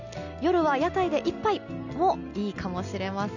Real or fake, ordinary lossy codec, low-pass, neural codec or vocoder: real; none; 7.2 kHz; none